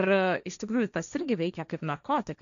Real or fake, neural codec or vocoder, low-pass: fake; codec, 16 kHz, 1.1 kbps, Voila-Tokenizer; 7.2 kHz